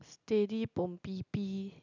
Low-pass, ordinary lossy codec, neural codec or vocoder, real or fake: 7.2 kHz; none; none; real